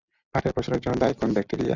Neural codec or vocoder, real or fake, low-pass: none; real; 7.2 kHz